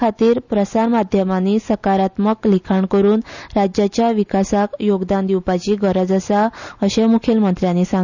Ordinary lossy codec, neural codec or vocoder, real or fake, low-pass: none; none; real; 7.2 kHz